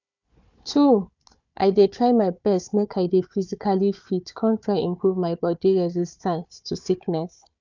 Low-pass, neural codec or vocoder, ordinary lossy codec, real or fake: 7.2 kHz; codec, 16 kHz, 4 kbps, FunCodec, trained on Chinese and English, 50 frames a second; none; fake